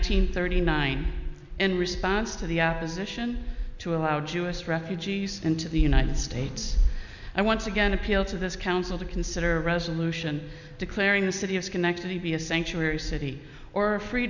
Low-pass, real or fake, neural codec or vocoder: 7.2 kHz; real; none